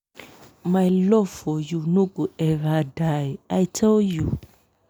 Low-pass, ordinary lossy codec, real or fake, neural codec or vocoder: none; none; real; none